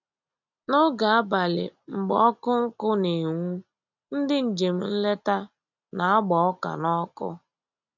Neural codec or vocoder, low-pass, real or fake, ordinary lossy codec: none; 7.2 kHz; real; none